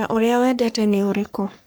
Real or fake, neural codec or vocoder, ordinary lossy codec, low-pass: fake; codec, 44.1 kHz, 3.4 kbps, Pupu-Codec; none; none